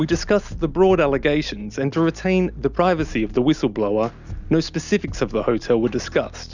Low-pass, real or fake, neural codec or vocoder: 7.2 kHz; real; none